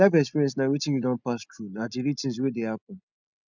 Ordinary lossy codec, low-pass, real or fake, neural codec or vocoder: none; 7.2 kHz; real; none